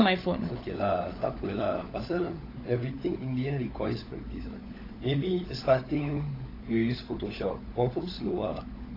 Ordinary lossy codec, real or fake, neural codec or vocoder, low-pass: AAC, 24 kbps; fake; codec, 16 kHz, 16 kbps, FunCodec, trained on LibriTTS, 50 frames a second; 5.4 kHz